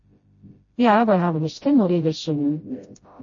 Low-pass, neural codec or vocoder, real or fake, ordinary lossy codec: 7.2 kHz; codec, 16 kHz, 0.5 kbps, FreqCodec, smaller model; fake; MP3, 32 kbps